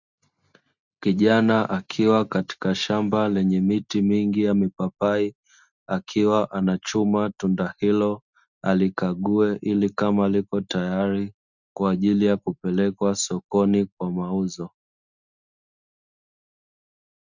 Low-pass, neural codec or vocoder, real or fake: 7.2 kHz; none; real